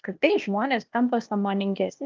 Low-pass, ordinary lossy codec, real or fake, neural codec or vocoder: 7.2 kHz; Opus, 24 kbps; fake; codec, 16 kHz, 0.8 kbps, ZipCodec